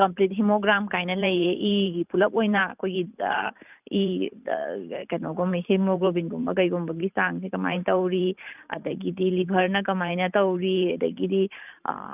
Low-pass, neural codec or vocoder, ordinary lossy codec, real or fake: 3.6 kHz; vocoder, 44.1 kHz, 128 mel bands every 512 samples, BigVGAN v2; none; fake